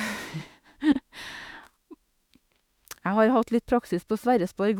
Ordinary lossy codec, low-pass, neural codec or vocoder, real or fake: none; 19.8 kHz; autoencoder, 48 kHz, 32 numbers a frame, DAC-VAE, trained on Japanese speech; fake